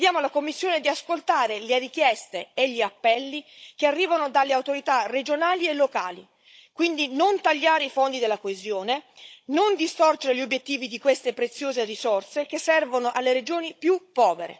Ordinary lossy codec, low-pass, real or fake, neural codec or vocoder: none; none; fake; codec, 16 kHz, 16 kbps, FunCodec, trained on Chinese and English, 50 frames a second